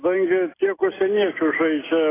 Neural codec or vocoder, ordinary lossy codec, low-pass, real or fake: none; AAC, 16 kbps; 3.6 kHz; real